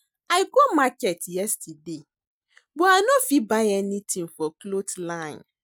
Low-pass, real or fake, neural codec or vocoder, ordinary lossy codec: none; real; none; none